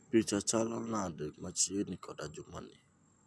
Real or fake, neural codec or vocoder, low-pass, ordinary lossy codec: real; none; none; none